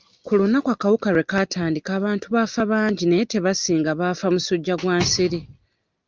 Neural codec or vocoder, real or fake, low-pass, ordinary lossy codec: none; real; 7.2 kHz; Opus, 32 kbps